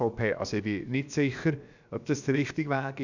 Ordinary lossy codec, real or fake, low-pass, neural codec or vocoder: none; fake; 7.2 kHz; codec, 16 kHz, about 1 kbps, DyCAST, with the encoder's durations